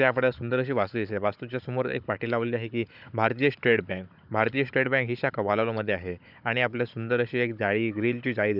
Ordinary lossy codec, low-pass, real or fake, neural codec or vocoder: none; 5.4 kHz; fake; codec, 16 kHz, 16 kbps, FunCodec, trained on Chinese and English, 50 frames a second